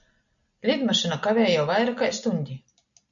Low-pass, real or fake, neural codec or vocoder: 7.2 kHz; real; none